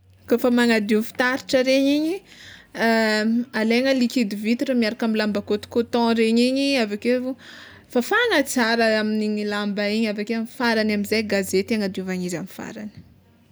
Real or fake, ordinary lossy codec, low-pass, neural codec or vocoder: real; none; none; none